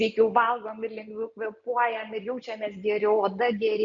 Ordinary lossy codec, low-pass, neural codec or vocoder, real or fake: MP3, 64 kbps; 7.2 kHz; none; real